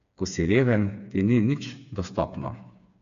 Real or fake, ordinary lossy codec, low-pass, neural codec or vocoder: fake; none; 7.2 kHz; codec, 16 kHz, 4 kbps, FreqCodec, smaller model